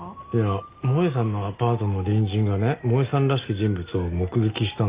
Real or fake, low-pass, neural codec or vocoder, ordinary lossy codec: real; 3.6 kHz; none; Opus, 32 kbps